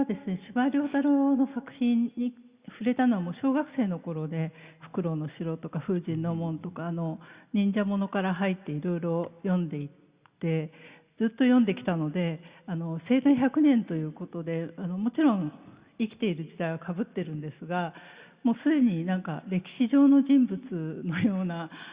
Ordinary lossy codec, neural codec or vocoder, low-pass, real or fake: Opus, 64 kbps; none; 3.6 kHz; real